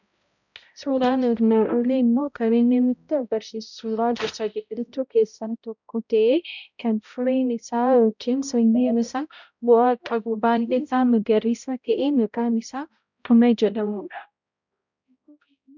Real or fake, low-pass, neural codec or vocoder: fake; 7.2 kHz; codec, 16 kHz, 0.5 kbps, X-Codec, HuBERT features, trained on balanced general audio